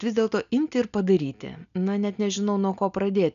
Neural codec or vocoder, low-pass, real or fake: none; 7.2 kHz; real